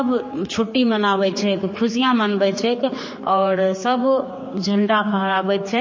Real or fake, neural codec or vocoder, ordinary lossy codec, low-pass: fake; codec, 16 kHz, 4 kbps, X-Codec, HuBERT features, trained on general audio; MP3, 32 kbps; 7.2 kHz